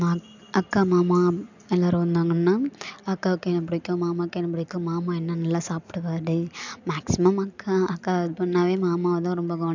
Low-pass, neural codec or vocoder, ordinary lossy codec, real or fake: 7.2 kHz; none; none; real